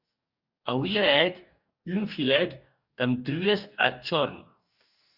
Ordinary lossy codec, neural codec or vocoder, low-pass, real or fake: Opus, 64 kbps; codec, 44.1 kHz, 2.6 kbps, DAC; 5.4 kHz; fake